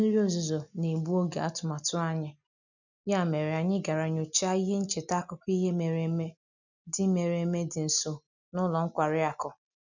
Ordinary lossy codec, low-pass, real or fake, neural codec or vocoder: none; 7.2 kHz; real; none